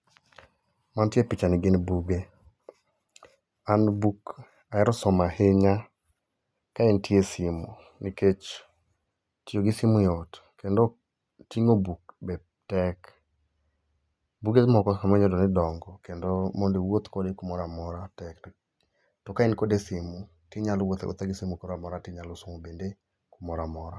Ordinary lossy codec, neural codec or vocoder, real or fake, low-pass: none; none; real; none